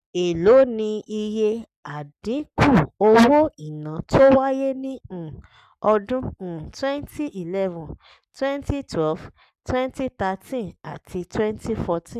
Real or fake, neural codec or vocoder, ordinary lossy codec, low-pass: fake; codec, 44.1 kHz, 7.8 kbps, Pupu-Codec; none; 14.4 kHz